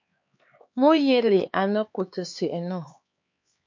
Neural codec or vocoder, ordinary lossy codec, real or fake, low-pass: codec, 16 kHz, 4 kbps, X-Codec, HuBERT features, trained on LibriSpeech; MP3, 48 kbps; fake; 7.2 kHz